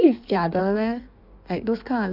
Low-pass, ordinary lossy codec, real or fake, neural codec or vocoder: 5.4 kHz; none; fake; codec, 16 kHz in and 24 kHz out, 1.1 kbps, FireRedTTS-2 codec